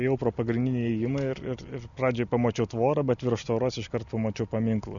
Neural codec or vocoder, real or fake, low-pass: none; real; 7.2 kHz